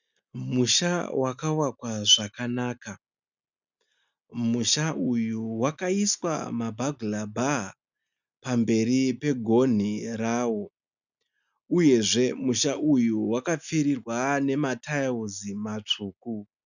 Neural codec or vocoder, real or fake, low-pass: none; real; 7.2 kHz